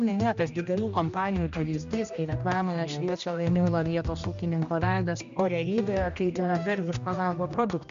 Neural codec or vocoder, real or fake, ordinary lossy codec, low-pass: codec, 16 kHz, 1 kbps, X-Codec, HuBERT features, trained on general audio; fake; MP3, 64 kbps; 7.2 kHz